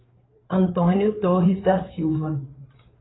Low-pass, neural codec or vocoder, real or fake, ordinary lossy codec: 7.2 kHz; codec, 16 kHz, 4 kbps, FreqCodec, larger model; fake; AAC, 16 kbps